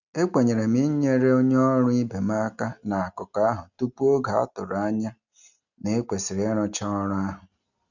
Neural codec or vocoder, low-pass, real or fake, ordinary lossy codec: none; 7.2 kHz; real; none